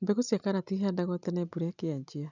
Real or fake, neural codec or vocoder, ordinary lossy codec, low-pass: real; none; none; 7.2 kHz